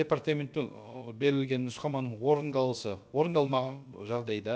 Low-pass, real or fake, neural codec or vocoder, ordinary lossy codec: none; fake; codec, 16 kHz, about 1 kbps, DyCAST, with the encoder's durations; none